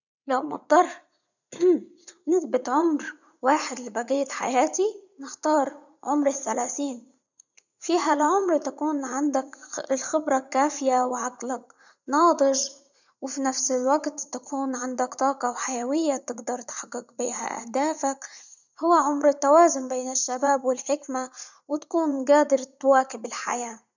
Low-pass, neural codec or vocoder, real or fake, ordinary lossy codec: 7.2 kHz; vocoder, 44.1 kHz, 80 mel bands, Vocos; fake; none